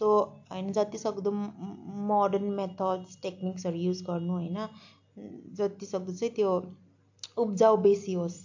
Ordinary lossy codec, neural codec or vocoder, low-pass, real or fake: AAC, 48 kbps; none; 7.2 kHz; real